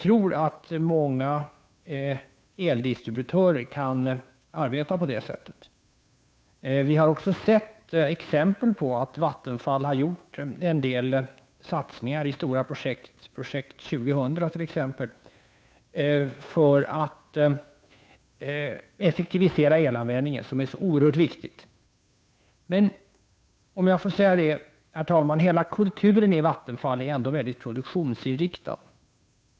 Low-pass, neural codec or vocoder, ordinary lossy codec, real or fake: none; codec, 16 kHz, 2 kbps, FunCodec, trained on Chinese and English, 25 frames a second; none; fake